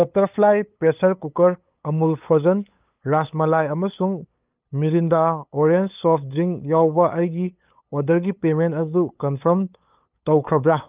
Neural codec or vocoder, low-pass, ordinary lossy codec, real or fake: codec, 16 kHz, 8 kbps, FunCodec, trained on LibriTTS, 25 frames a second; 3.6 kHz; Opus, 24 kbps; fake